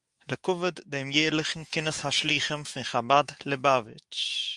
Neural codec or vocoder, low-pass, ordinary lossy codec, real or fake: autoencoder, 48 kHz, 128 numbers a frame, DAC-VAE, trained on Japanese speech; 10.8 kHz; Opus, 32 kbps; fake